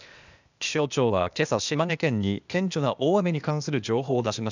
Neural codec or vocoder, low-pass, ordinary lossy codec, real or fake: codec, 16 kHz, 0.8 kbps, ZipCodec; 7.2 kHz; none; fake